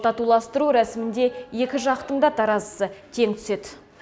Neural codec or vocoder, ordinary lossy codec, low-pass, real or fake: none; none; none; real